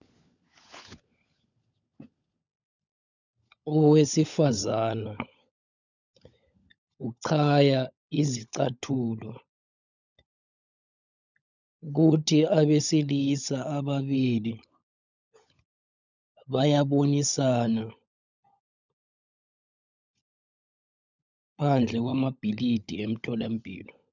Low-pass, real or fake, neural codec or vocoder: 7.2 kHz; fake; codec, 16 kHz, 16 kbps, FunCodec, trained on LibriTTS, 50 frames a second